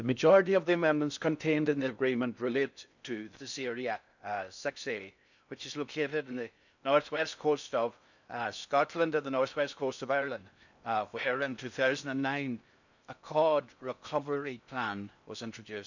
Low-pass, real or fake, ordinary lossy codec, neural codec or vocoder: 7.2 kHz; fake; none; codec, 16 kHz in and 24 kHz out, 0.6 kbps, FocalCodec, streaming, 4096 codes